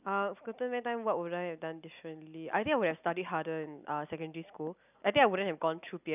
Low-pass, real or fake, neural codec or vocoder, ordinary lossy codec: 3.6 kHz; real; none; none